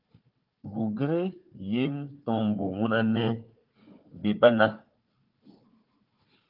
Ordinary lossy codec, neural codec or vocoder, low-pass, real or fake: Opus, 24 kbps; codec, 16 kHz, 4 kbps, FunCodec, trained on Chinese and English, 50 frames a second; 5.4 kHz; fake